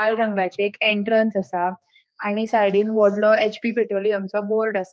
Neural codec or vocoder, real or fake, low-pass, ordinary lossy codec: codec, 16 kHz, 2 kbps, X-Codec, HuBERT features, trained on general audio; fake; none; none